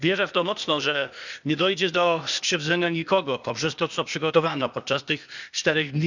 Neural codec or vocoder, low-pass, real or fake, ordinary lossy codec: codec, 16 kHz, 0.8 kbps, ZipCodec; 7.2 kHz; fake; none